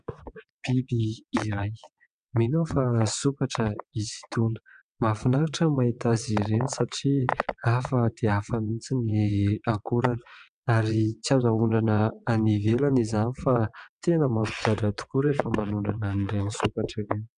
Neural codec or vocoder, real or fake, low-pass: vocoder, 22.05 kHz, 80 mel bands, WaveNeXt; fake; 9.9 kHz